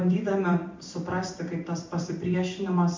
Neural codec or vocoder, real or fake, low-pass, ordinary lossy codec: vocoder, 44.1 kHz, 128 mel bands every 256 samples, BigVGAN v2; fake; 7.2 kHz; MP3, 48 kbps